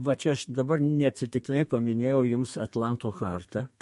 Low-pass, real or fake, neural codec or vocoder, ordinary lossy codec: 14.4 kHz; fake; codec, 32 kHz, 1.9 kbps, SNAC; MP3, 48 kbps